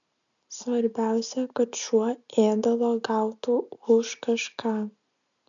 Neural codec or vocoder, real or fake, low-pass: none; real; 7.2 kHz